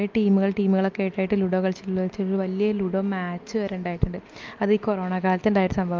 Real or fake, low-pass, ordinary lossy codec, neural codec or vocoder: real; 7.2 kHz; Opus, 24 kbps; none